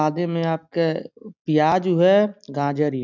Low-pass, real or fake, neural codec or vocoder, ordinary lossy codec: 7.2 kHz; real; none; none